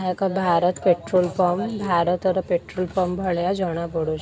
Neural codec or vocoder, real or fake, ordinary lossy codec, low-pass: none; real; none; none